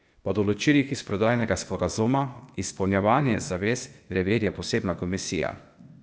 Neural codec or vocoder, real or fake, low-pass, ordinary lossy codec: codec, 16 kHz, 0.8 kbps, ZipCodec; fake; none; none